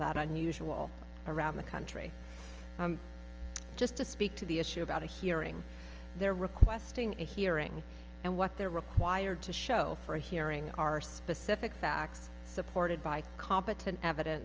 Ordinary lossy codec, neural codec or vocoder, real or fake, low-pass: Opus, 24 kbps; none; real; 7.2 kHz